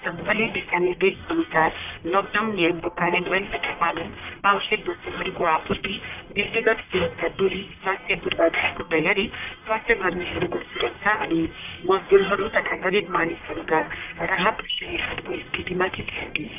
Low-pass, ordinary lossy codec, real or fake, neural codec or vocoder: 3.6 kHz; none; fake; codec, 44.1 kHz, 1.7 kbps, Pupu-Codec